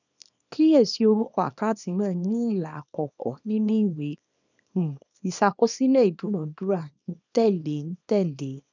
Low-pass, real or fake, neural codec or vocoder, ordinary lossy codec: 7.2 kHz; fake; codec, 24 kHz, 0.9 kbps, WavTokenizer, small release; none